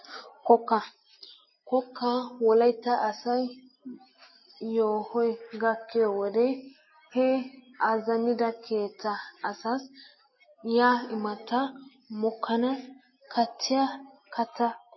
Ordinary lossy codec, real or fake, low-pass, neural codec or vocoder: MP3, 24 kbps; real; 7.2 kHz; none